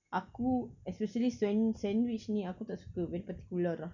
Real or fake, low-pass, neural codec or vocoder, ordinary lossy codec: real; 7.2 kHz; none; none